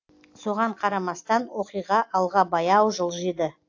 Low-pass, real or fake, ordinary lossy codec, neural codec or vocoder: 7.2 kHz; real; AAC, 48 kbps; none